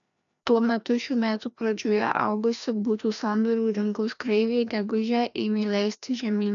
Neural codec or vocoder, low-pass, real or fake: codec, 16 kHz, 1 kbps, FreqCodec, larger model; 7.2 kHz; fake